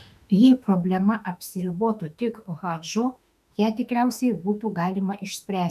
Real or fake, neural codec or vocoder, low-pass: fake; autoencoder, 48 kHz, 32 numbers a frame, DAC-VAE, trained on Japanese speech; 14.4 kHz